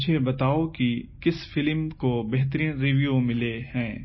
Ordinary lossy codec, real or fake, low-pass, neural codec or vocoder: MP3, 24 kbps; real; 7.2 kHz; none